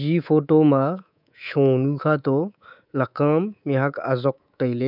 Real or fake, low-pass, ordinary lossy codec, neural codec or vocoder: fake; 5.4 kHz; none; codec, 24 kHz, 3.1 kbps, DualCodec